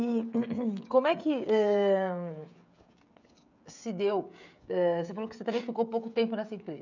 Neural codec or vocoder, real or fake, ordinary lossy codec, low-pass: codec, 16 kHz, 16 kbps, FreqCodec, smaller model; fake; none; 7.2 kHz